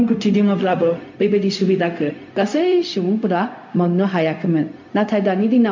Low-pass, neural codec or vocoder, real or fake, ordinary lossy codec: 7.2 kHz; codec, 16 kHz, 0.4 kbps, LongCat-Audio-Codec; fake; MP3, 64 kbps